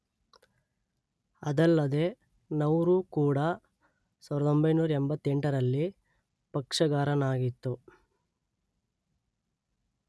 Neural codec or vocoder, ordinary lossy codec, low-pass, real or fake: none; none; none; real